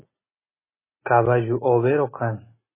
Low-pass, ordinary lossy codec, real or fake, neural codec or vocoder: 3.6 kHz; MP3, 16 kbps; real; none